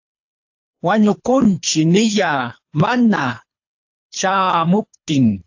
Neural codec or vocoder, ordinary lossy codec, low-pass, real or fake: codec, 24 kHz, 3 kbps, HILCodec; AAC, 48 kbps; 7.2 kHz; fake